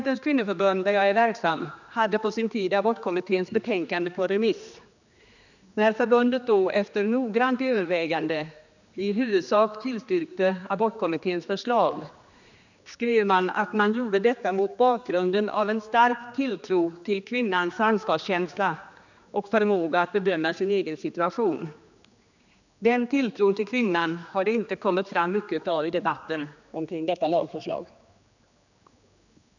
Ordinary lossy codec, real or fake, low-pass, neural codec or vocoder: none; fake; 7.2 kHz; codec, 16 kHz, 2 kbps, X-Codec, HuBERT features, trained on general audio